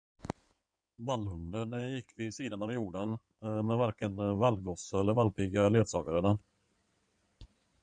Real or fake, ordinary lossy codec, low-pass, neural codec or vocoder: fake; MP3, 96 kbps; 9.9 kHz; codec, 16 kHz in and 24 kHz out, 2.2 kbps, FireRedTTS-2 codec